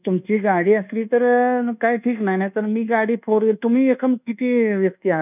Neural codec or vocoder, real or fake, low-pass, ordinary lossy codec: codec, 24 kHz, 1.2 kbps, DualCodec; fake; 3.6 kHz; none